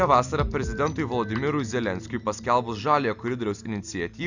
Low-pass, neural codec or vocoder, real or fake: 7.2 kHz; none; real